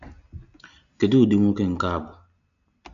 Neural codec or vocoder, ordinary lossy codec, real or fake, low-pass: none; none; real; 7.2 kHz